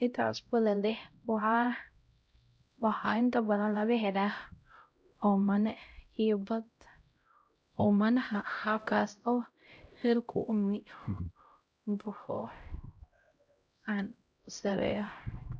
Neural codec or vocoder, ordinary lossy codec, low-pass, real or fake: codec, 16 kHz, 0.5 kbps, X-Codec, HuBERT features, trained on LibriSpeech; none; none; fake